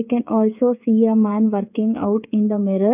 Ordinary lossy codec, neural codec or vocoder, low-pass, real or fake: none; codec, 16 kHz, 8 kbps, FreqCodec, smaller model; 3.6 kHz; fake